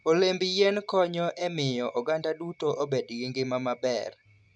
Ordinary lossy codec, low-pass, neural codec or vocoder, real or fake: none; none; none; real